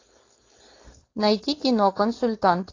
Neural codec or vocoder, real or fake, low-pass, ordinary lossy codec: codec, 16 kHz, 4.8 kbps, FACodec; fake; 7.2 kHz; AAC, 32 kbps